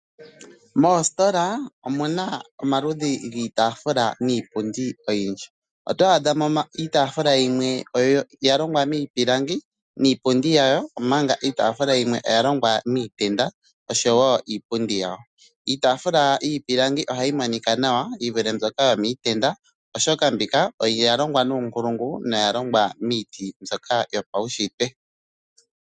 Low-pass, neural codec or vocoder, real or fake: 9.9 kHz; none; real